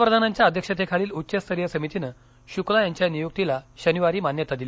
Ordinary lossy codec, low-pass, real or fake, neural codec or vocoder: none; none; real; none